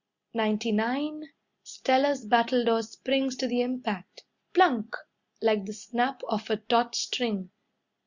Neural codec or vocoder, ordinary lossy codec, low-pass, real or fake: none; Opus, 64 kbps; 7.2 kHz; real